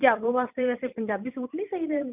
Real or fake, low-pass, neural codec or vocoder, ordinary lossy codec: fake; 3.6 kHz; vocoder, 44.1 kHz, 128 mel bands every 256 samples, BigVGAN v2; none